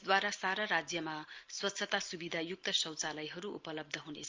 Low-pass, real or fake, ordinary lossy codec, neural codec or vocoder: 7.2 kHz; real; Opus, 24 kbps; none